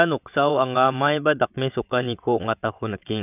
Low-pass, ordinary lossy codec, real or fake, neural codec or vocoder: 3.6 kHz; none; fake; vocoder, 22.05 kHz, 80 mel bands, WaveNeXt